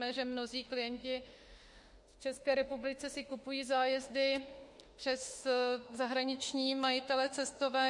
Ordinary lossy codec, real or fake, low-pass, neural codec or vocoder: MP3, 48 kbps; fake; 10.8 kHz; autoencoder, 48 kHz, 32 numbers a frame, DAC-VAE, trained on Japanese speech